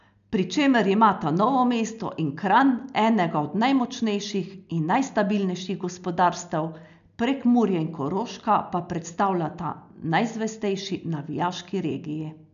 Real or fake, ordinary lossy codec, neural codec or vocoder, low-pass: real; none; none; 7.2 kHz